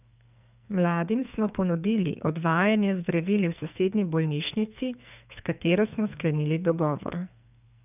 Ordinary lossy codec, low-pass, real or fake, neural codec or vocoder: none; 3.6 kHz; fake; codec, 44.1 kHz, 2.6 kbps, SNAC